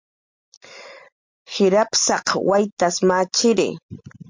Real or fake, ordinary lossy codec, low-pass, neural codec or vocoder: real; MP3, 48 kbps; 7.2 kHz; none